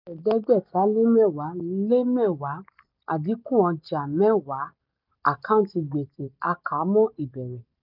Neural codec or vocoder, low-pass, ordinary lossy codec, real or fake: none; 5.4 kHz; none; real